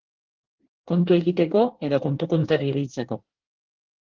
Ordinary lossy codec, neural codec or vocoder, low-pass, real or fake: Opus, 16 kbps; codec, 24 kHz, 1 kbps, SNAC; 7.2 kHz; fake